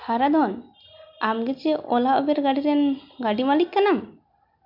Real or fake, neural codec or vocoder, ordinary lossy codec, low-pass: real; none; MP3, 48 kbps; 5.4 kHz